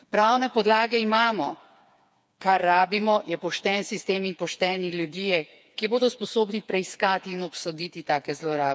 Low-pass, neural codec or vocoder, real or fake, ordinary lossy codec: none; codec, 16 kHz, 4 kbps, FreqCodec, smaller model; fake; none